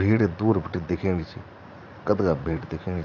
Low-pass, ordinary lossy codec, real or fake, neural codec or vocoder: 7.2 kHz; none; fake; vocoder, 44.1 kHz, 80 mel bands, Vocos